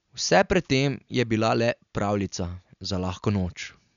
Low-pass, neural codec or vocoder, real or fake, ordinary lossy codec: 7.2 kHz; none; real; none